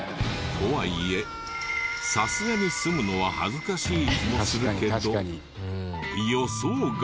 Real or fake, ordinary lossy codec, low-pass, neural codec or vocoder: real; none; none; none